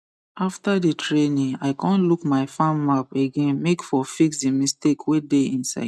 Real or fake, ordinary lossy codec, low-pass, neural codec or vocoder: real; none; none; none